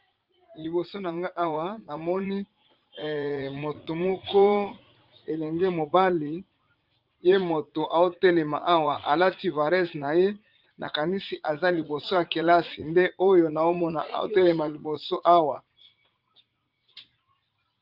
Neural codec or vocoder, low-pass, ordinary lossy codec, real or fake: vocoder, 44.1 kHz, 80 mel bands, Vocos; 5.4 kHz; Opus, 32 kbps; fake